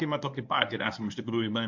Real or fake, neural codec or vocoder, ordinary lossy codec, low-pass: fake; codec, 24 kHz, 0.9 kbps, WavTokenizer, medium speech release version 1; MP3, 48 kbps; 7.2 kHz